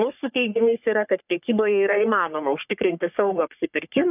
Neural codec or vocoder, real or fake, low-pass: codec, 44.1 kHz, 3.4 kbps, Pupu-Codec; fake; 3.6 kHz